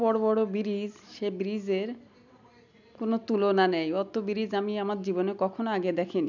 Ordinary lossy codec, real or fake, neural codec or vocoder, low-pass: none; real; none; 7.2 kHz